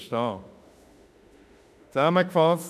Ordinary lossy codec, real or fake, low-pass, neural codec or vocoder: none; fake; 14.4 kHz; autoencoder, 48 kHz, 32 numbers a frame, DAC-VAE, trained on Japanese speech